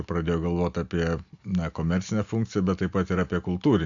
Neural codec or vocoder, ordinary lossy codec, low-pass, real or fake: none; AAC, 64 kbps; 7.2 kHz; real